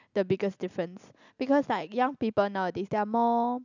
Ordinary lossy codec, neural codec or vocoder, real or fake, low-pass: none; none; real; 7.2 kHz